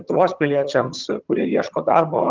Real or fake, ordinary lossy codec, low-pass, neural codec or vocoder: fake; Opus, 32 kbps; 7.2 kHz; vocoder, 22.05 kHz, 80 mel bands, HiFi-GAN